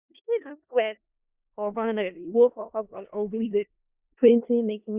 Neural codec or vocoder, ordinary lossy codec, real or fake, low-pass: codec, 16 kHz in and 24 kHz out, 0.4 kbps, LongCat-Audio-Codec, four codebook decoder; Opus, 64 kbps; fake; 3.6 kHz